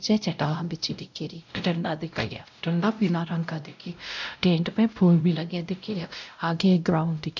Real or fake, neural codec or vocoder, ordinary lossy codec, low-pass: fake; codec, 16 kHz, 0.5 kbps, X-Codec, WavLM features, trained on Multilingual LibriSpeech; none; 7.2 kHz